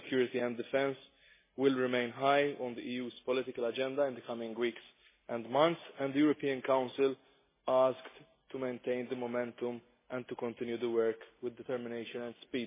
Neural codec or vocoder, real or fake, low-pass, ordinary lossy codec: none; real; 3.6 kHz; MP3, 16 kbps